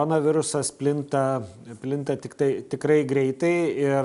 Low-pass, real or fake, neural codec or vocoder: 10.8 kHz; real; none